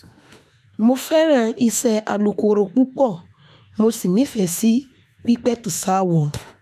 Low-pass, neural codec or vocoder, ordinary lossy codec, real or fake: 14.4 kHz; autoencoder, 48 kHz, 32 numbers a frame, DAC-VAE, trained on Japanese speech; none; fake